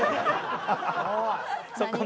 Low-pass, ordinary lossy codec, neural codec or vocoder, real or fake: none; none; none; real